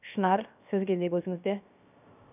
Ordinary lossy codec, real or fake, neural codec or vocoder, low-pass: none; fake; codec, 16 kHz, 0.7 kbps, FocalCodec; 3.6 kHz